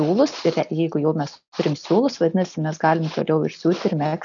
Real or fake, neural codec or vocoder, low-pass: real; none; 7.2 kHz